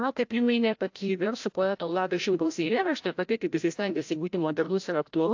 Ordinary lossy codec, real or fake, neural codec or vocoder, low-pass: AAC, 48 kbps; fake; codec, 16 kHz, 0.5 kbps, FreqCodec, larger model; 7.2 kHz